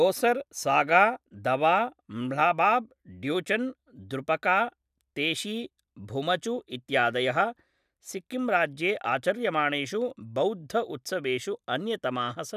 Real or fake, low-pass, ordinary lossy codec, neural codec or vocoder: real; 14.4 kHz; none; none